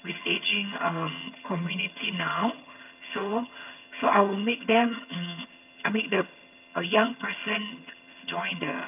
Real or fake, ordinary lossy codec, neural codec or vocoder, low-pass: fake; none; vocoder, 22.05 kHz, 80 mel bands, HiFi-GAN; 3.6 kHz